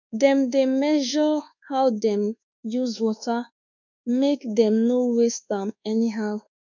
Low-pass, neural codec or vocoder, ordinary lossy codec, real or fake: 7.2 kHz; codec, 24 kHz, 1.2 kbps, DualCodec; none; fake